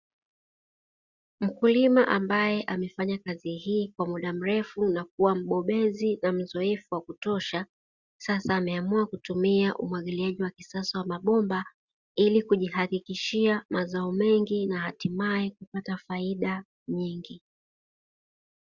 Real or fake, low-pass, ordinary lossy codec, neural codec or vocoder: real; 7.2 kHz; Opus, 64 kbps; none